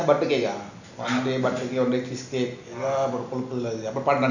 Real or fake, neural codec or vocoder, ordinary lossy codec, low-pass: real; none; none; 7.2 kHz